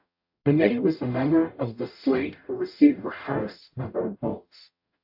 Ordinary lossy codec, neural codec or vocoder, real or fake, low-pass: AAC, 48 kbps; codec, 44.1 kHz, 0.9 kbps, DAC; fake; 5.4 kHz